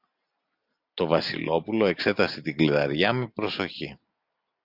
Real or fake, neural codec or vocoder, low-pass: real; none; 5.4 kHz